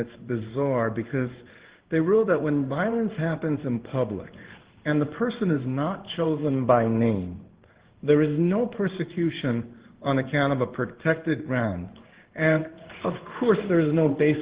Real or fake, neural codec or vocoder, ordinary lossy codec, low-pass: fake; codec, 16 kHz in and 24 kHz out, 1 kbps, XY-Tokenizer; Opus, 16 kbps; 3.6 kHz